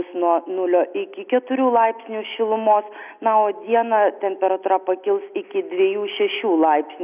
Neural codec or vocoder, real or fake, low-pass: none; real; 3.6 kHz